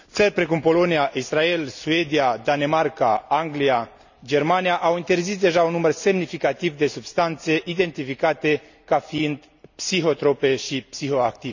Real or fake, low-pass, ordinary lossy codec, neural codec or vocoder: real; 7.2 kHz; none; none